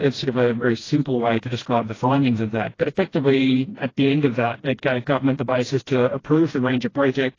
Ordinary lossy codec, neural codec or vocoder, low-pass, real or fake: AAC, 32 kbps; codec, 16 kHz, 1 kbps, FreqCodec, smaller model; 7.2 kHz; fake